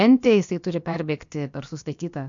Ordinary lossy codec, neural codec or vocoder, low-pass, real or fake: MP3, 64 kbps; codec, 16 kHz, about 1 kbps, DyCAST, with the encoder's durations; 7.2 kHz; fake